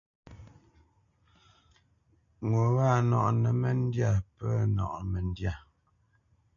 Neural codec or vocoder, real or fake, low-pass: none; real; 7.2 kHz